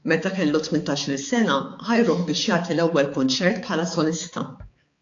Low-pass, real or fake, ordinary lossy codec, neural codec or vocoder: 7.2 kHz; fake; AAC, 48 kbps; codec, 16 kHz, 4 kbps, X-Codec, HuBERT features, trained on balanced general audio